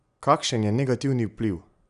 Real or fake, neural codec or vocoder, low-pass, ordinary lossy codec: real; none; 10.8 kHz; none